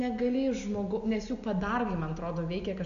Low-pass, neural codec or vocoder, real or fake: 7.2 kHz; none; real